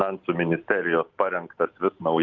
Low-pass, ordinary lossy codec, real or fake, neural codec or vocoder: 7.2 kHz; Opus, 24 kbps; real; none